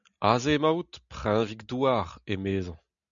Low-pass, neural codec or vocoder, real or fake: 7.2 kHz; none; real